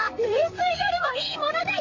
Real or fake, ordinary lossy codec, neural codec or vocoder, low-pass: fake; Opus, 64 kbps; codec, 32 kHz, 1.9 kbps, SNAC; 7.2 kHz